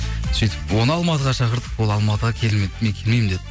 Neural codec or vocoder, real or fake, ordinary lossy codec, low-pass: none; real; none; none